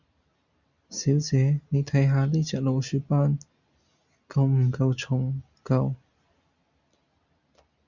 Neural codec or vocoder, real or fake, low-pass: none; real; 7.2 kHz